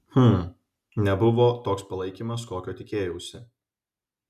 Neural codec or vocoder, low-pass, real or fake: none; 14.4 kHz; real